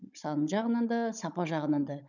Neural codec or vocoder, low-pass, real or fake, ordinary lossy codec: codec, 16 kHz, 16 kbps, FunCodec, trained on Chinese and English, 50 frames a second; 7.2 kHz; fake; none